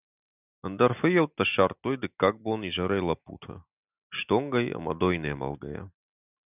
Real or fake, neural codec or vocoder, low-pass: real; none; 3.6 kHz